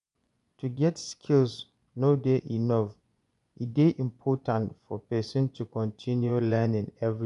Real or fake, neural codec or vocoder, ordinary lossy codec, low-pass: fake; vocoder, 24 kHz, 100 mel bands, Vocos; none; 10.8 kHz